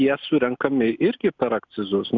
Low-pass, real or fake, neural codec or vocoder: 7.2 kHz; real; none